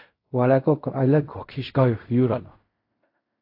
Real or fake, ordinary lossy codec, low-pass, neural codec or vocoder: fake; AAC, 32 kbps; 5.4 kHz; codec, 16 kHz in and 24 kHz out, 0.4 kbps, LongCat-Audio-Codec, fine tuned four codebook decoder